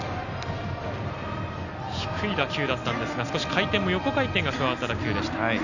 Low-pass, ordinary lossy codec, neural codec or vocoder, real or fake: 7.2 kHz; none; none; real